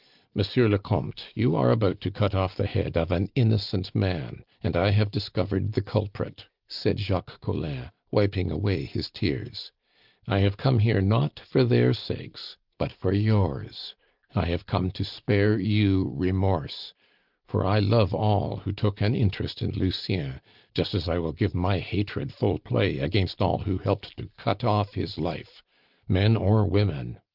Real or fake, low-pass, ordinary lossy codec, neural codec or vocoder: real; 5.4 kHz; Opus, 32 kbps; none